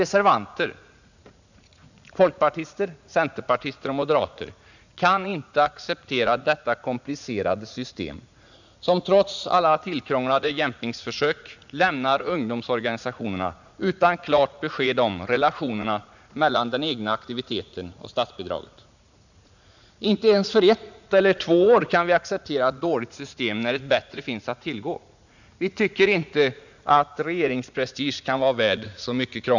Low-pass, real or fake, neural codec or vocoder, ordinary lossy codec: 7.2 kHz; fake; vocoder, 44.1 kHz, 80 mel bands, Vocos; none